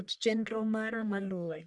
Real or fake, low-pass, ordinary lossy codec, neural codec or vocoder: fake; 10.8 kHz; none; codec, 44.1 kHz, 1.7 kbps, Pupu-Codec